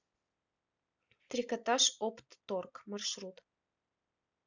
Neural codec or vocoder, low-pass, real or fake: none; 7.2 kHz; real